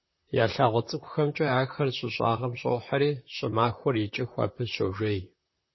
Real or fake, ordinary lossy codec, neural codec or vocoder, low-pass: fake; MP3, 24 kbps; vocoder, 44.1 kHz, 128 mel bands, Pupu-Vocoder; 7.2 kHz